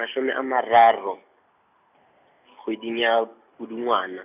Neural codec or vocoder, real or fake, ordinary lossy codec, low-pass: none; real; none; 3.6 kHz